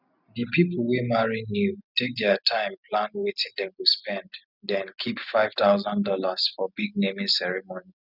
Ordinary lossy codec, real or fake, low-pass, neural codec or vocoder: none; real; 5.4 kHz; none